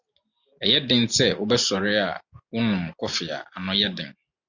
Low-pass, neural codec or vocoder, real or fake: 7.2 kHz; none; real